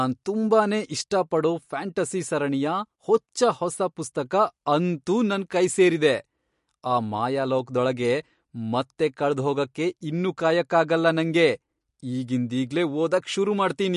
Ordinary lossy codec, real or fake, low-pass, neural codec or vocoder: MP3, 48 kbps; real; 14.4 kHz; none